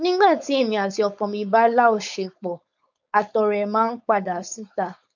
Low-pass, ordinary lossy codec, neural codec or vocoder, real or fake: 7.2 kHz; none; codec, 16 kHz, 4.8 kbps, FACodec; fake